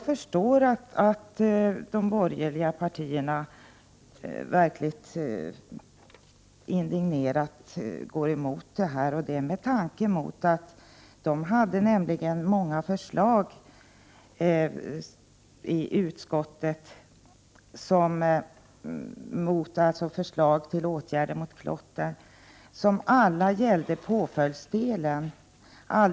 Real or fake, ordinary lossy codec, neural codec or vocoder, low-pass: real; none; none; none